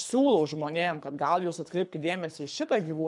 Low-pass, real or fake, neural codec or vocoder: 10.8 kHz; fake; codec, 24 kHz, 3 kbps, HILCodec